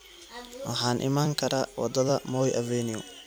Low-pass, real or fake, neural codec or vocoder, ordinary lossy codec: none; fake; vocoder, 44.1 kHz, 128 mel bands every 256 samples, BigVGAN v2; none